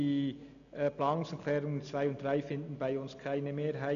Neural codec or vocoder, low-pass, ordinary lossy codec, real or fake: none; 7.2 kHz; none; real